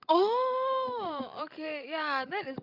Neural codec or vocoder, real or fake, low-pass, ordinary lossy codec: codec, 16 kHz, 16 kbps, FreqCodec, larger model; fake; 5.4 kHz; none